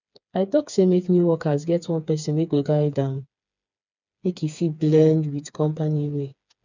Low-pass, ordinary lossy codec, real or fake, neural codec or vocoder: 7.2 kHz; none; fake; codec, 16 kHz, 4 kbps, FreqCodec, smaller model